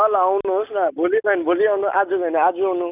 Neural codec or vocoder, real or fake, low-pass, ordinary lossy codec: none; real; 3.6 kHz; none